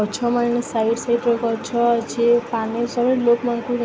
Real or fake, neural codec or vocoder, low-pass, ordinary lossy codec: real; none; none; none